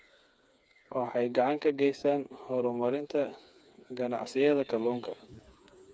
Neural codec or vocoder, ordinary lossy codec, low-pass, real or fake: codec, 16 kHz, 4 kbps, FreqCodec, smaller model; none; none; fake